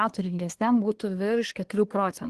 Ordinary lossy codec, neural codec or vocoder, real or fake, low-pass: Opus, 16 kbps; codec, 24 kHz, 1 kbps, SNAC; fake; 10.8 kHz